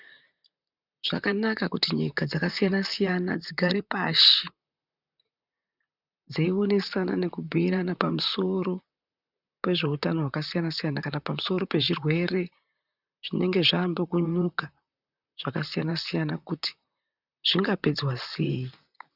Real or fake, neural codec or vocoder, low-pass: fake; vocoder, 44.1 kHz, 128 mel bands, Pupu-Vocoder; 5.4 kHz